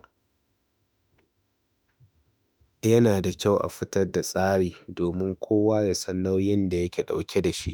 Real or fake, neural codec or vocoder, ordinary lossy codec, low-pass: fake; autoencoder, 48 kHz, 32 numbers a frame, DAC-VAE, trained on Japanese speech; none; none